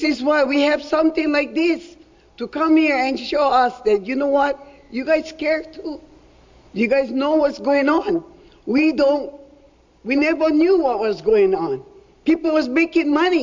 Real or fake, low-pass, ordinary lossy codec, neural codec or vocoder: real; 7.2 kHz; MP3, 64 kbps; none